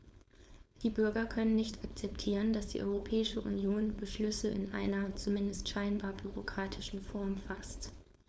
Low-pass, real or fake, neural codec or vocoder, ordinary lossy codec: none; fake; codec, 16 kHz, 4.8 kbps, FACodec; none